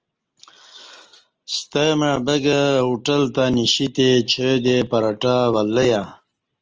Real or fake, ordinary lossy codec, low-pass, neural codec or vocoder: real; Opus, 24 kbps; 7.2 kHz; none